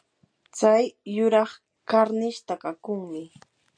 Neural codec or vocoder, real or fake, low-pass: none; real; 9.9 kHz